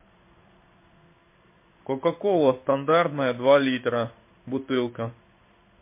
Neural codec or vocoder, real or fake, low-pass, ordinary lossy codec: codec, 16 kHz in and 24 kHz out, 1 kbps, XY-Tokenizer; fake; 3.6 kHz; MP3, 24 kbps